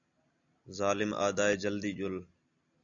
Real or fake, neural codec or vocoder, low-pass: real; none; 7.2 kHz